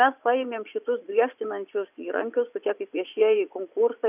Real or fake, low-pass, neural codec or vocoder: fake; 3.6 kHz; vocoder, 24 kHz, 100 mel bands, Vocos